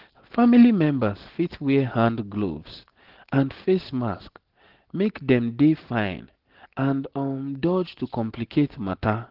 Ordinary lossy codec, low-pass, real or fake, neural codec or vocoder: Opus, 16 kbps; 5.4 kHz; real; none